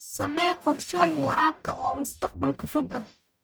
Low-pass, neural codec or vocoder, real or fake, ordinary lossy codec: none; codec, 44.1 kHz, 0.9 kbps, DAC; fake; none